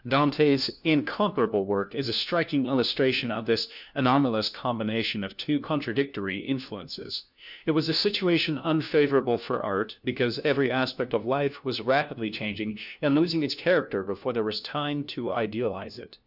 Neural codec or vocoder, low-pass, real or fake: codec, 16 kHz, 1 kbps, FunCodec, trained on LibriTTS, 50 frames a second; 5.4 kHz; fake